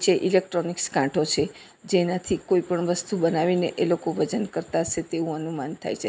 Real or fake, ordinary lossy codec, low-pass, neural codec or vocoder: real; none; none; none